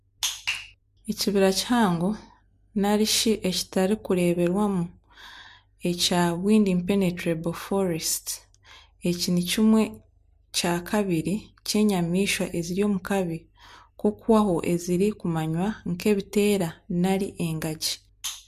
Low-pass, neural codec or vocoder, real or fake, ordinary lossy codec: 14.4 kHz; none; real; MP3, 64 kbps